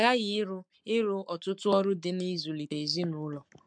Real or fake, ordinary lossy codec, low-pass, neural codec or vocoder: fake; MP3, 64 kbps; 9.9 kHz; codec, 44.1 kHz, 7.8 kbps, Pupu-Codec